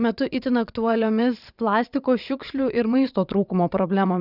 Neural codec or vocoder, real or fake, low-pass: vocoder, 22.05 kHz, 80 mel bands, WaveNeXt; fake; 5.4 kHz